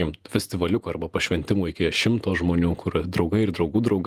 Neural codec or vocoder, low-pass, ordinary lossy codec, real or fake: vocoder, 48 kHz, 128 mel bands, Vocos; 14.4 kHz; Opus, 32 kbps; fake